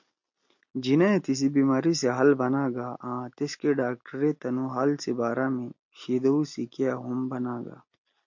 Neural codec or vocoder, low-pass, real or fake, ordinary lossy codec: none; 7.2 kHz; real; MP3, 48 kbps